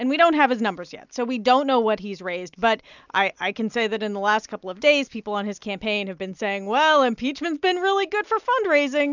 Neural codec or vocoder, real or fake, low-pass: none; real; 7.2 kHz